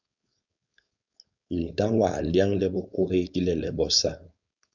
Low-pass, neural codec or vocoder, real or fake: 7.2 kHz; codec, 16 kHz, 4.8 kbps, FACodec; fake